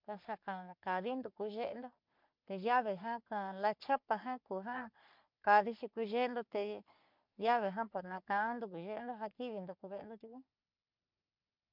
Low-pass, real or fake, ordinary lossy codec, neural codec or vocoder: 5.4 kHz; fake; MP3, 48 kbps; codec, 44.1 kHz, 3.4 kbps, Pupu-Codec